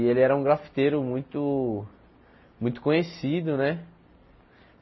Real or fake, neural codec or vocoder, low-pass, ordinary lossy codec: real; none; 7.2 kHz; MP3, 24 kbps